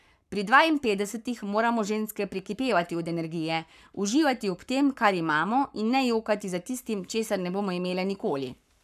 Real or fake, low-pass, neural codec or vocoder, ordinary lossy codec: fake; 14.4 kHz; codec, 44.1 kHz, 7.8 kbps, Pupu-Codec; none